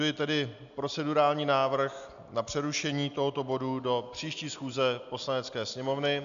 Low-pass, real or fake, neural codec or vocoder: 7.2 kHz; real; none